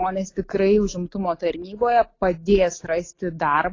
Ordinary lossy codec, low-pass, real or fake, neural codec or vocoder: AAC, 32 kbps; 7.2 kHz; real; none